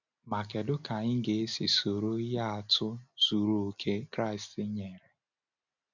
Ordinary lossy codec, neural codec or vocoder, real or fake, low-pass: none; none; real; 7.2 kHz